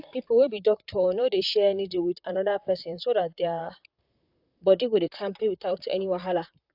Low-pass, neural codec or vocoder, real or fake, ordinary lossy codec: 5.4 kHz; vocoder, 44.1 kHz, 128 mel bands, Pupu-Vocoder; fake; AAC, 48 kbps